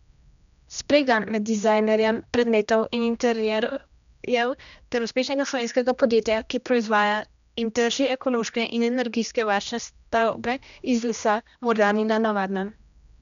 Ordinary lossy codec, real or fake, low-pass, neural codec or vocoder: none; fake; 7.2 kHz; codec, 16 kHz, 1 kbps, X-Codec, HuBERT features, trained on general audio